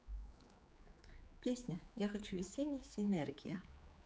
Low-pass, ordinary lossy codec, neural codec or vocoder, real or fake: none; none; codec, 16 kHz, 4 kbps, X-Codec, HuBERT features, trained on general audio; fake